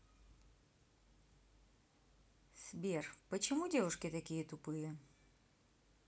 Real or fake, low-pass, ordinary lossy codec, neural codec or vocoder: real; none; none; none